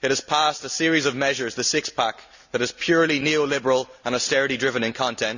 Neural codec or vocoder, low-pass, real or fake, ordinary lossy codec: none; 7.2 kHz; real; none